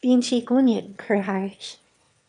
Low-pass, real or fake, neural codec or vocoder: 9.9 kHz; fake; autoencoder, 22.05 kHz, a latent of 192 numbers a frame, VITS, trained on one speaker